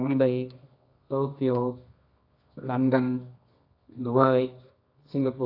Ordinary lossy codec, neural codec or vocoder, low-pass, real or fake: AAC, 32 kbps; codec, 24 kHz, 0.9 kbps, WavTokenizer, medium music audio release; 5.4 kHz; fake